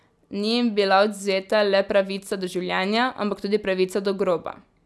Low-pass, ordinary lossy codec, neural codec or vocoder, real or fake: none; none; none; real